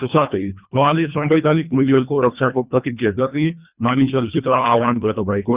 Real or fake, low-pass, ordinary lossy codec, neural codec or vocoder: fake; 3.6 kHz; Opus, 64 kbps; codec, 24 kHz, 1.5 kbps, HILCodec